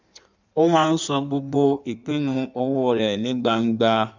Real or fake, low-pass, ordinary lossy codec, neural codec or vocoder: fake; 7.2 kHz; none; codec, 16 kHz in and 24 kHz out, 1.1 kbps, FireRedTTS-2 codec